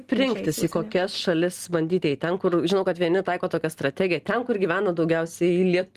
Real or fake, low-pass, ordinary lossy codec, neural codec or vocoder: real; 14.4 kHz; Opus, 24 kbps; none